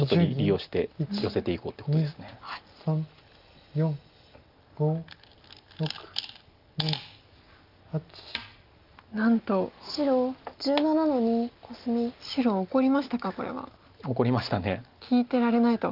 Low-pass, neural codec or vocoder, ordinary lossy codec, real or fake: 5.4 kHz; none; Opus, 32 kbps; real